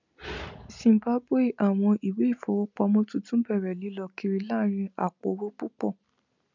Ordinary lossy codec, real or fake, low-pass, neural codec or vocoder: none; real; 7.2 kHz; none